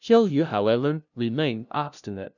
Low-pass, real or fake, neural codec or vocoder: 7.2 kHz; fake; codec, 16 kHz, 0.5 kbps, FunCodec, trained on LibriTTS, 25 frames a second